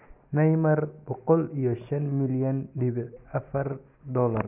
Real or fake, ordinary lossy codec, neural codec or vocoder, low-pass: real; none; none; 3.6 kHz